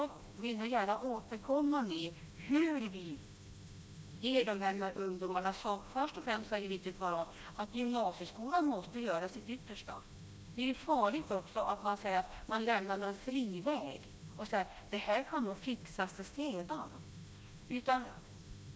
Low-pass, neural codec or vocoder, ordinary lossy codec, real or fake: none; codec, 16 kHz, 1 kbps, FreqCodec, smaller model; none; fake